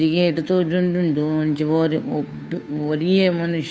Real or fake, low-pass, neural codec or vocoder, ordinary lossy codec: fake; none; codec, 16 kHz, 2 kbps, FunCodec, trained on Chinese and English, 25 frames a second; none